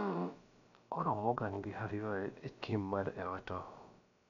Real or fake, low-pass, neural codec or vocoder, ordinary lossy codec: fake; 7.2 kHz; codec, 16 kHz, about 1 kbps, DyCAST, with the encoder's durations; none